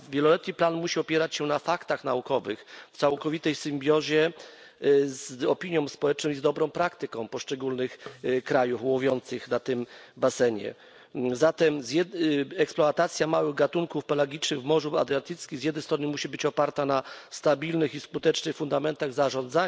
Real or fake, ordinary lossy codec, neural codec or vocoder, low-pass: real; none; none; none